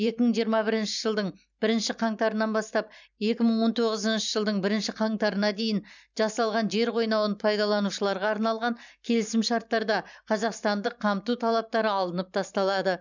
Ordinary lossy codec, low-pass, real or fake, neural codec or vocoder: none; 7.2 kHz; fake; vocoder, 44.1 kHz, 128 mel bands every 512 samples, BigVGAN v2